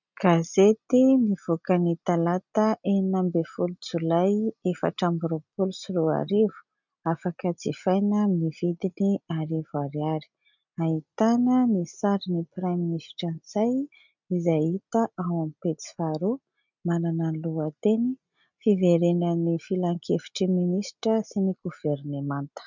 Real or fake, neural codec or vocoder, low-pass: real; none; 7.2 kHz